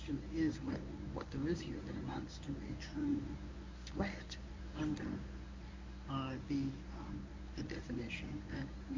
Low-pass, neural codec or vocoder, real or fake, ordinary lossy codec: 7.2 kHz; codec, 24 kHz, 0.9 kbps, WavTokenizer, medium speech release version 1; fake; MP3, 64 kbps